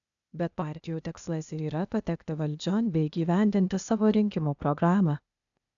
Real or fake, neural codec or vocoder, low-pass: fake; codec, 16 kHz, 0.8 kbps, ZipCodec; 7.2 kHz